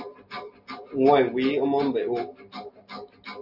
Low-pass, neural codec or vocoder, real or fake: 5.4 kHz; none; real